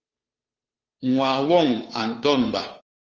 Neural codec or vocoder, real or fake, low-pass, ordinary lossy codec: codec, 16 kHz, 2 kbps, FunCodec, trained on Chinese and English, 25 frames a second; fake; 7.2 kHz; Opus, 24 kbps